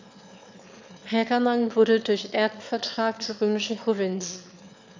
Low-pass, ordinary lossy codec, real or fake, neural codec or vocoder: 7.2 kHz; MP3, 64 kbps; fake; autoencoder, 22.05 kHz, a latent of 192 numbers a frame, VITS, trained on one speaker